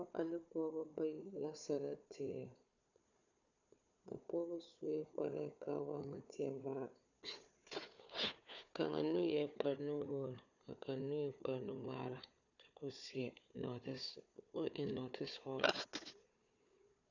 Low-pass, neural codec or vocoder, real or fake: 7.2 kHz; codec, 16 kHz, 4 kbps, FunCodec, trained on Chinese and English, 50 frames a second; fake